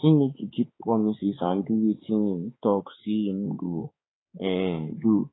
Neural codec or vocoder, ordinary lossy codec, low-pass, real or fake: codec, 16 kHz, 4 kbps, X-Codec, HuBERT features, trained on balanced general audio; AAC, 16 kbps; 7.2 kHz; fake